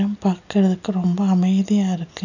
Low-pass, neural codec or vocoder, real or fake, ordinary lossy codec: 7.2 kHz; none; real; none